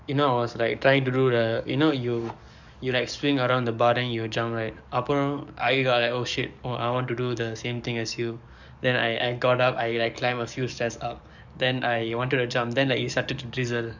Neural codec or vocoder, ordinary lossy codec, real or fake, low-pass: codec, 44.1 kHz, 7.8 kbps, DAC; none; fake; 7.2 kHz